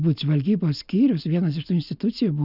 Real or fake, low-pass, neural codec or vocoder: real; 5.4 kHz; none